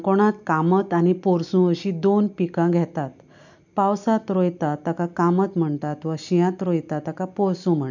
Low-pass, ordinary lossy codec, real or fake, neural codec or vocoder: 7.2 kHz; none; real; none